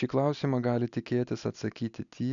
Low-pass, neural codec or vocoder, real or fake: 7.2 kHz; none; real